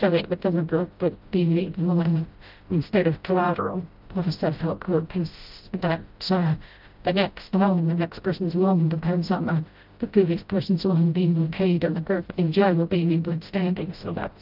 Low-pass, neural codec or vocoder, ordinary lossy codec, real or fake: 5.4 kHz; codec, 16 kHz, 0.5 kbps, FreqCodec, smaller model; Opus, 32 kbps; fake